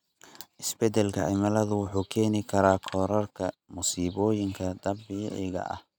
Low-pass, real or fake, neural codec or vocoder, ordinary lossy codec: none; real; none; none